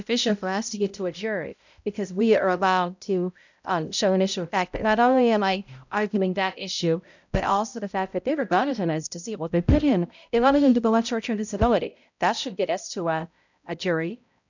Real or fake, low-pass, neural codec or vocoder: fake; 7.2 kHz; codec, 16 kHz, 0.5 kbps, X-Codec, HuBERT features, trained on balanced general audio